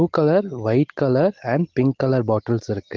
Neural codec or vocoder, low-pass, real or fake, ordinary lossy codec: none; 7.2 kHz; real; Opus, 16 kbps